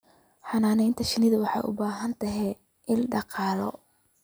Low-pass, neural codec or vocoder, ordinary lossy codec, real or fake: none; none; none; real